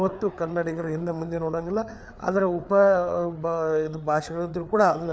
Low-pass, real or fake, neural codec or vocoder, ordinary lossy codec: none; fake; codec, 16 kHz, 4 kbps, FreqCodec, larger model; none